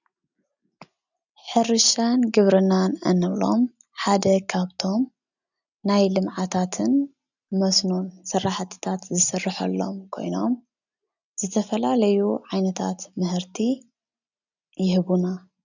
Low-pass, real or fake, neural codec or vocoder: 7.2 kHz; real; none